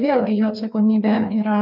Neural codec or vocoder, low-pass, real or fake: codec, 16 kHz, 2 kbps, FreqCodec, larger model; 5.4 kHz; fake